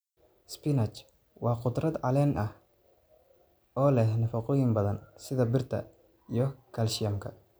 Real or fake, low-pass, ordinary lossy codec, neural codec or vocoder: real; none; none; none